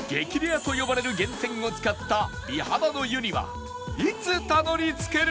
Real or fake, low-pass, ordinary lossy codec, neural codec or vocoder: real; none; none; none